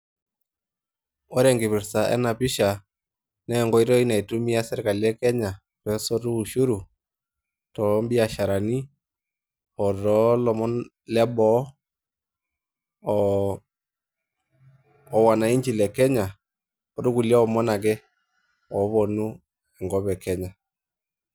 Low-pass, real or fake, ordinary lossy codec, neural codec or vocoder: none; real; none; none